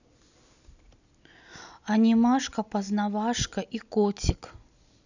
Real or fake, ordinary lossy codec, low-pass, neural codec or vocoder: real; none; 7.2 kHz; none